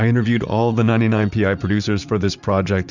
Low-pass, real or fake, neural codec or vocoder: 7.2 kHz; fake; vocoder, 44.1 kHz, 80 mel bands, Vocos